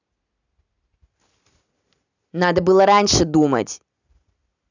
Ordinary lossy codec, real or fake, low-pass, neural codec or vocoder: none; real; 7.2 kHz; none